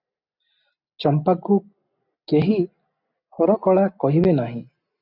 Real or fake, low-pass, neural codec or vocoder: real; 5.4 kHz; none